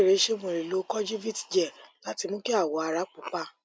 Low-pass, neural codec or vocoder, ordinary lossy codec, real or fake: none; none; none; real